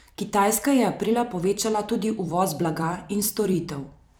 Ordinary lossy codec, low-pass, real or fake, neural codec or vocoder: none; none; fake; vocoder, 44.1 kHz, 128 mel bands every 256 samples, BigVGAN v2